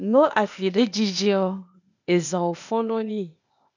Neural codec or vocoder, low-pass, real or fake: codec, 16 kHz, 0.8 kbps, ZipCodec; 7.2 kHz; fake